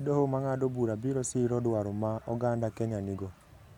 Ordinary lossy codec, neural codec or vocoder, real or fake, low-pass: none; none; real; 19.8 kHz